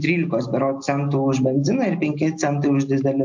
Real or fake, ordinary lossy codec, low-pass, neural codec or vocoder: real; MP3, 48 kbps; 7.2 kHz; none